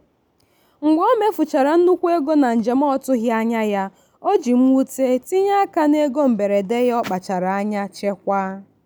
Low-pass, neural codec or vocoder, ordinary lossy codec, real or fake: none; none; none; real